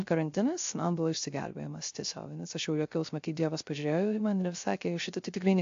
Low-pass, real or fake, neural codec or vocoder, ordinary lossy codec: 7.2 kHz; fake; codec, 16 kHz, 0.3 kbps, FocalCodec; AAC, 48 kbps